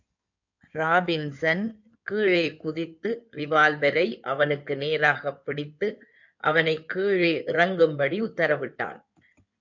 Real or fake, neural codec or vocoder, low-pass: fake; codec, 16 kHz in and 24 kHz out, 2.2 kbps, FireRedTTS-2 codec; 7.2 kHz